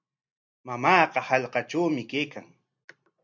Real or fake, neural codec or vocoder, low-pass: real; none; 7.2 kHz